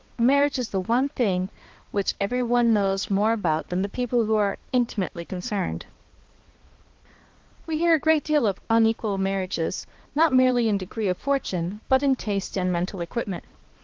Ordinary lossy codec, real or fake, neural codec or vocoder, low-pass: Opus, 16 kbps; fake; codec, 16 kHz, 2 kbps, X-Codec, HuBERT features, trained on balanced general audio; 7.2 kHz